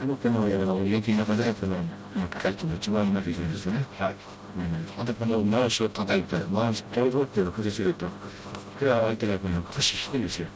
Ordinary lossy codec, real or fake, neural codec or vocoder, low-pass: none; fake; codec, 16 kHz, 0.5 kbps, FreqCodec, smaller model; none